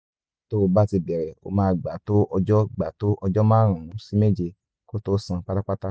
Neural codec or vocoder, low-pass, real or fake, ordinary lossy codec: none; none; real; none